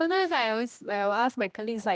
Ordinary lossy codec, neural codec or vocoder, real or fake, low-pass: none; codec, 16 kHz, 1 kbps, X-Codec, HuBERT features, trained on general audio; fake; none